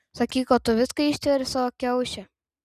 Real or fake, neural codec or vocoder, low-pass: real; none; 14.4 kHz